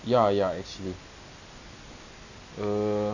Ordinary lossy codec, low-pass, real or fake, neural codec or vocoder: none; 7.2 kHz; real; none